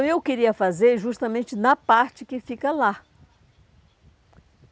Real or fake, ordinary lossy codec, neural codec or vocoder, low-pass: real; none; none; none